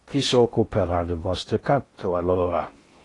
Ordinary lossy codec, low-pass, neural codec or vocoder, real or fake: AAC, 32 kbps; 10.8 kHz; codec, 16 kHz in and 24 kHz out, 0.6 kbps, FocalCodec, streaming, 4096 codes; fake